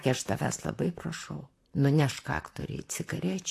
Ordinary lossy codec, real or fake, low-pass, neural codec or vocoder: AAC, 64 kbps; fake; 14.4 kHz; vocoder, 44.1 kHz, 128 mel bands, Pupu-Vocoder